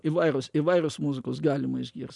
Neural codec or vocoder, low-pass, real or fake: none; 10.8 kHz; real